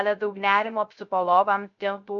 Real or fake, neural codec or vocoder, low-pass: fake; codec, 16 kHz, 0.3 kbps, FocalCodec; 7.2 kHz